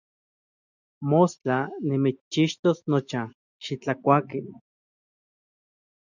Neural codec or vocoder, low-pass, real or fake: none; 7.2 kHz; real